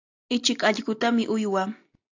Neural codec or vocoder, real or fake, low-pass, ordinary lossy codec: none; real; 7.2 kHz; AAC, 48 kbps